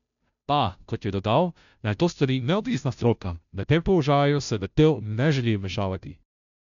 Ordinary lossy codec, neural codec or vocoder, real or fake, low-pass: none; codec, 16 kHz, 0.5 kbps, FunCodec, trained on Chinese and English, 25 frames a second; fake; 7.2 kHz